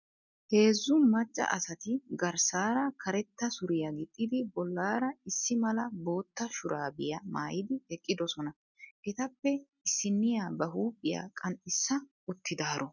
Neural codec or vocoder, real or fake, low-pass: none; real; 7.2 kHz